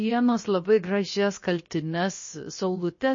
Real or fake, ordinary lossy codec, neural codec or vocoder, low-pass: fake; MP3, 32 kbps; codec, 16 kHz, about 1 kbps, DyCAST, with the encoder's durations; 7.2 kHz